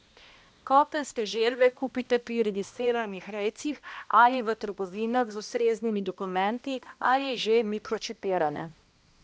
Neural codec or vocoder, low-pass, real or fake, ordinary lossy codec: codec, 16 kHz, 1 kbps, X-Codec, HuBERT features, trained on balanced general audio; none; fake; none